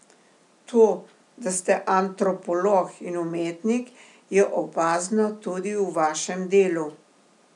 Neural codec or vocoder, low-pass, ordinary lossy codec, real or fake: none; 10.8 kHz; none; real